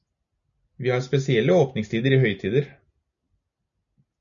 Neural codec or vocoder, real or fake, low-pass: none; real; 7.2 kHz